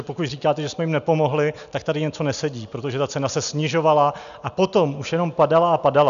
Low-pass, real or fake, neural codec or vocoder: 7.2 kHz; real; none